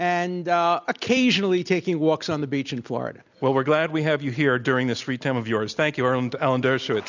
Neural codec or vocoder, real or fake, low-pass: none; real; 7.2 kHz